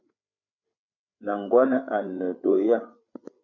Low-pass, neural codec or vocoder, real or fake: 7.2 kHz; codec, 16 kHz, 8 kbps, FreqCodec, larger model; fake